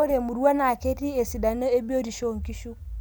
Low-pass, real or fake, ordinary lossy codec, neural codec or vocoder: none; real; none; none